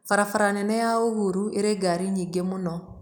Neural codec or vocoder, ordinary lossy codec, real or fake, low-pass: none; none; real; none